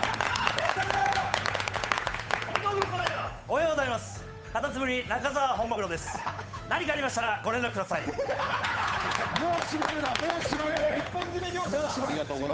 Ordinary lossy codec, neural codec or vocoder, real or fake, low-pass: none; codec, 16 kHz, 8 kbps, FunCodec, trained on Chinese and English, 25 frames a second; fake; none